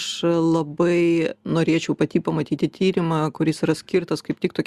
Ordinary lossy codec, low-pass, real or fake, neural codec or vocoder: Opus, 64 kbps; 14.4 kHz; real; none